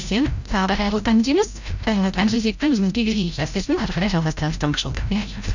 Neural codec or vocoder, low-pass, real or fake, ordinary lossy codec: codec, 16 kHz, 0.5 kbps, FreqCodec, larger model; 7.2 kHz; fake; none